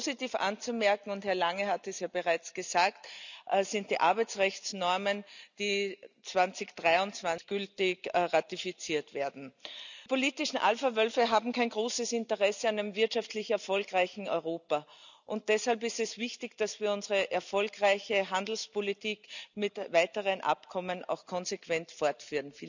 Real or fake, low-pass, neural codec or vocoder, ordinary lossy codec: real; 7.2 kHz; none; none